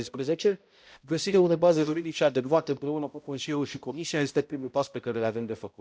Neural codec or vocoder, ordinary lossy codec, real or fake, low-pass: codec, 16 kHz, 0.5 kbps, X-Codec, HuBERT features, trained on balanced general audio; none; fake; none